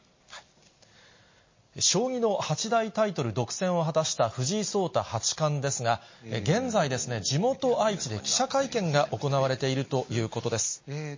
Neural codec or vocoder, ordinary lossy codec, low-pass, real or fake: none; MP3, 32 kbps; 7.2 kHz; real